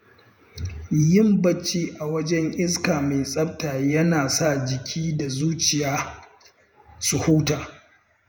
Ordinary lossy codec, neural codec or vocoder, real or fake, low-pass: none; vocoder, 48 kHz, 128 mel bands, Vocos; fake; none